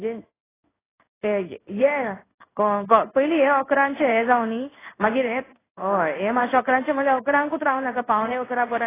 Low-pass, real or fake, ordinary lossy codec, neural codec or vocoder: 3.6 kHz; fake; AAC, 16 kbps; codec, 16 kHz in and 24 kHz out, 1 kbps, XY-Tokenizer